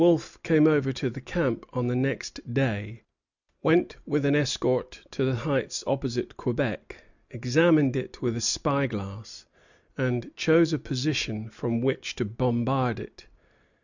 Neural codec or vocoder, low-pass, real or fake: none; 7.2 kHz; real